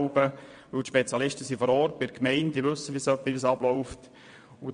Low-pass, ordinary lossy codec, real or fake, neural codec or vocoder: 9.9 kHz; MP3, 48 kbps; fake; vocoder, 22.05 kHz, 80 mel bands, WaveNeXt